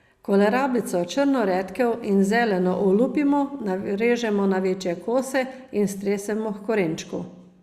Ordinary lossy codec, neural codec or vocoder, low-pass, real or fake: Opus, 64 kbps; none; 14.4 kHz; real